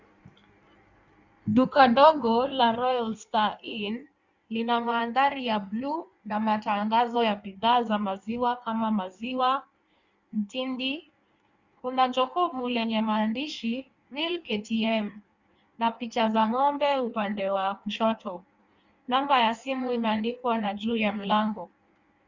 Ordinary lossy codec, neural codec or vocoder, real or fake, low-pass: Opus, 64 kbps; codec, 16 kHz in and 24 kHz out, 1.1 kbps, FireRedTTS-2 codec; fake; 7.2 kHz